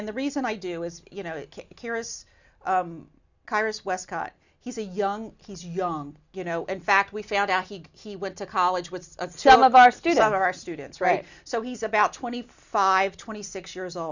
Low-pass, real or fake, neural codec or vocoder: 7.2 kHz; real; none